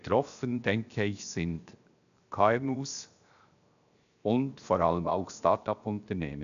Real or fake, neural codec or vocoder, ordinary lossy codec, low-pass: fake; codec, 16 kHz, 0.7 kbps, FocalCodec; MP3, 96 kbps; 7.2 kHz